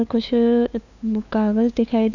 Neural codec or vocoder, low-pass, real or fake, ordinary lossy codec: codec, 16 kHz, 2 kbps, FunCodec, trained on LibriTTS, 25 frames a second; 7.2 kHz; fake; none